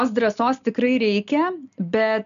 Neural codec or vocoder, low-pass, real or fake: none; 7.2 kHz; real